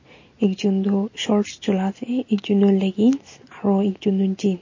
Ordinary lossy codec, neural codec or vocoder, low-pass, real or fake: MP3, 32 kbps; none; 7.2 kHz; real